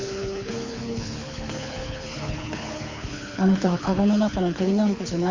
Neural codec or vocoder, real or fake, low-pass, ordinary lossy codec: codec, 24 kHz, 6 kbps, HILCodec; fake; 7.2 kHz; Opus, 64 kbps